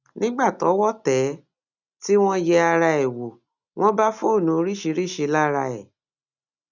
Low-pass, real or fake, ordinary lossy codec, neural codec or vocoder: 7.2 kHz; real; none; none